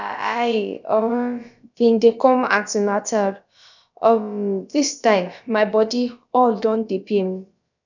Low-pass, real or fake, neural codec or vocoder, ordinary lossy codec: 7.2 kHz; fake; codec, 16 kHz, about 1 kbps, DyCAST, with the encoder's durations; none